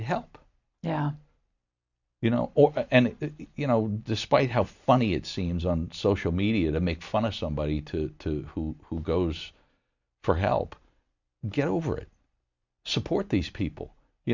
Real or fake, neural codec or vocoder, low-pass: real; none; 7.2 kHz